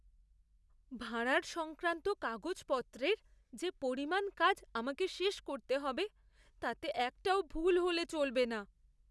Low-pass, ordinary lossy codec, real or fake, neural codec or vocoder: none; none; real; none